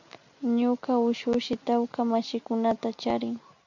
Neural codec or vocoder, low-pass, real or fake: none; 7.2 kHz; real